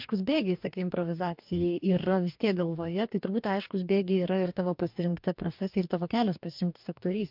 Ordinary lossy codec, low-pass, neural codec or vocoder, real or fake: MP3, 48 kbps; 5.4 kHz; codec, 44.1 kHz, 2.6 kbps, DAC; fake